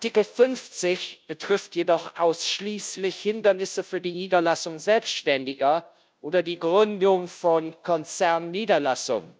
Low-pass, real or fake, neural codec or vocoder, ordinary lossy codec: none; fake; codec, 16 kHz, 0.5 kbps, FunCodec, trained on Chinese and English, 25 frames a second; none